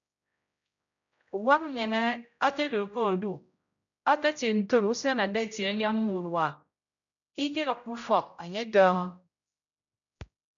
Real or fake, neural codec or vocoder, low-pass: fake; codec, 16 kHz, 0.5 kbps, X-Codec, HuBERT features, trained on general audio; 7.2 kHz